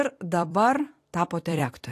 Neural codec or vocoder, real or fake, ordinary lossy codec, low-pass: vocoder, 44.1 kHz, 128 mel bands every 256 samples, BigVGAN v2; fake; AAC, 64 kbps; 14.4 kHz